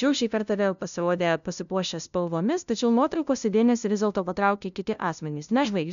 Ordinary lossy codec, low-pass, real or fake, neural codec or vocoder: MP3, 96 kbps; 7.2 kHz; fake; codec, 16 kHz, 0.5 kbps, FunCodec, trained on LibriTTS, 25 frames a second